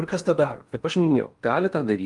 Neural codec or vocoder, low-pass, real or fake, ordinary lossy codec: codec, 16 kHz in and 24 kHz out, 0.8 kbps, FocalCodec, streaming, 65536 codes; 10.8 kHz; fake; Opus, 32 kbps